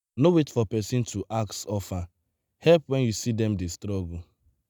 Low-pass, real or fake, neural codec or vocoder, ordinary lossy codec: none; real; none; none